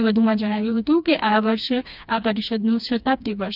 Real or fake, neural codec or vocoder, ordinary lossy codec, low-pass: fake; codec, 16 kHz, 2 kbps, FreqCodec, smaller model; none; 5.4 kHz